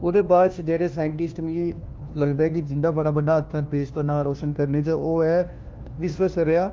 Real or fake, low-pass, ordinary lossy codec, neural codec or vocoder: fake; 7.2 kHz; Opus, 32 kbps; codec, 16 kHz, 1 kbps, FunCodec, trained on LibriTTS, 50 frames a second